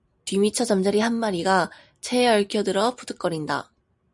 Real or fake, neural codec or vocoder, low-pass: real; none; 10.8 kHz